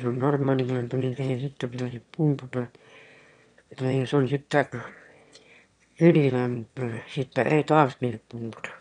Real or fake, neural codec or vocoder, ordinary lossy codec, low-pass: fake; autoencoder, 22.05 kHz, a latent of 192 numbers a frame, VITS, trained on one speaker; none; 9.9 kHz